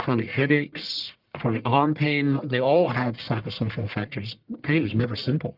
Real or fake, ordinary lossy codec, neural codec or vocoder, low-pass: fake; Opus, 32 kbps; codec, 44.1 kHz, 1.7 kbps, Pupu-Codec; 5.4 kHz